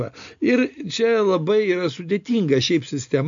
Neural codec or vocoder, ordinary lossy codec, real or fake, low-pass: none; AAC, 64 kbps; real; 7.2 kHz